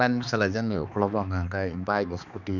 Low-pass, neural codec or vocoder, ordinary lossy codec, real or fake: 7.2 kHz; codec, 16 kHz, 2 kbps, X-Codec, HuBERT features, trained on balanced general audio; none; fake